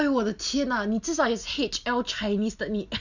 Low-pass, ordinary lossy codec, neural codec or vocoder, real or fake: 7.2 kHz; none; none; real